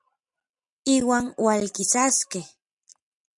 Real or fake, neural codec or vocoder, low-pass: real; none; 10.8 kHz